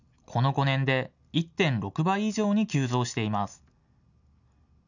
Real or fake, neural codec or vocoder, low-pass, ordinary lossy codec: real; none; 7.2 kHz; none